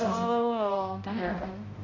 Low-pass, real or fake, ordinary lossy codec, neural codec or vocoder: 7.2 kHz; fake; AAC, 48 kbps; codec, 16 kHz, 0.5 kbps, X-Codec, HuBERT features, trained on balanced general audio